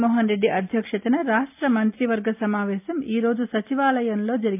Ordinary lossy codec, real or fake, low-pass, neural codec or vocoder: MP3, 32 kbps; real; 3.6 kHz; none